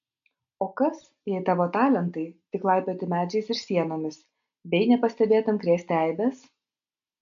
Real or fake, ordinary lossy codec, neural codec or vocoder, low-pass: real; MP3, 64 kbps; none; 7.2 kHz